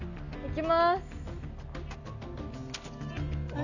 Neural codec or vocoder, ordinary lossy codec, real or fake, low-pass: none; none; real; 7.2 kHz